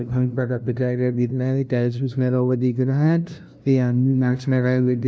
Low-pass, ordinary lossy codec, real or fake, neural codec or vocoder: none; none; fake; codec, 16 kHz, 0.5 kbps, FunCodec, trained on LibriTTS, 25 frames a second